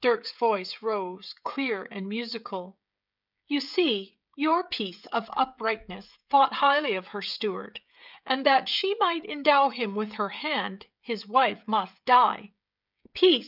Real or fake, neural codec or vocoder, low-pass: fake; codec, 16 kHz, 16 kbps, FreqCodec, smaller model; 5.4 kHz